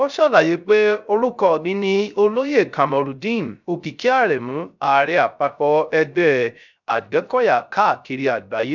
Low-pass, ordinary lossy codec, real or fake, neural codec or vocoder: 7.2 kHz; none; fake; codec, 16 kHz, 0.3 kbps, FocalCodec